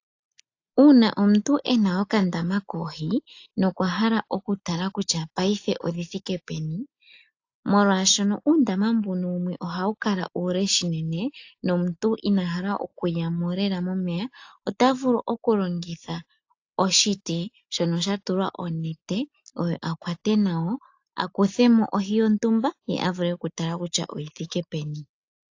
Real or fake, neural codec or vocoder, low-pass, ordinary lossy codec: real; none; 7.2 kHz; AAC, 48 kbps